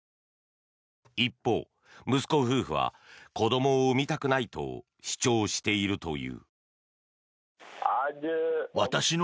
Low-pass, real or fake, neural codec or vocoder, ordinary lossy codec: none; real; none; none